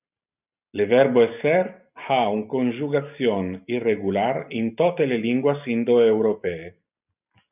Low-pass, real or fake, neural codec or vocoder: 3.6 kHz; real; none